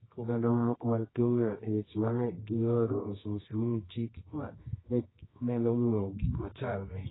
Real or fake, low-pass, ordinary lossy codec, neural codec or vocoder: fake; 7.2 kHz; AAC, 16 kbps; codec, 24 kHz, 0.9 kbps, WavTokenizer, medium music audio release